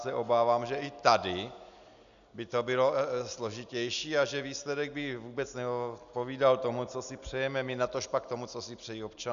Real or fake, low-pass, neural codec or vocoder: real; 7.2 kHz; none